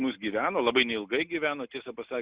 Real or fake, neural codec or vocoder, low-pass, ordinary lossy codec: real; none; 3.6 kHz; Opus, 64 kbps